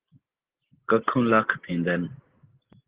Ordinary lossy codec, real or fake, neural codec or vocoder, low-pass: Opus, 16 kbps; real; none; 3.6 kHz